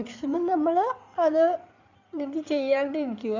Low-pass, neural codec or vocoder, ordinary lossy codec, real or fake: 7.2 kHz; codec, 16 kHz in and 24 kHz out, 2.2 kbps, FireRedTTS-2 codec; none; fake